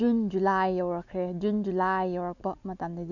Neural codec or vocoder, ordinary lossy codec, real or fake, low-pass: none; none; real; 7.2 kHz